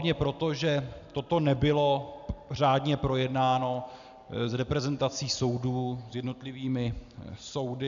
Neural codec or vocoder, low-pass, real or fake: none; 7.2 kHz; real